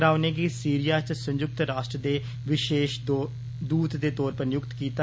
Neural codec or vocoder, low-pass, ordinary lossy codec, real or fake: none; none; none; real